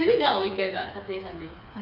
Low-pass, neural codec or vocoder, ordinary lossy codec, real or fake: 5.4 kHz; codec, 16 kHz, 4 kbps, FreqCodec, smaller model; none; fake